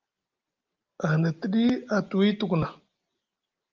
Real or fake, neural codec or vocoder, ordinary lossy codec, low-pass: real; none; Opus, 32 kbps; 7.2 kHz